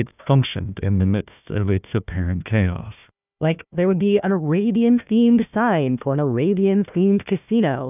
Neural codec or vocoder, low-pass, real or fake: codec, 16 kHz, 1 kbps, FunCodec, trained on Chinese and English, 50 frames a second; 3.6 kHz; fake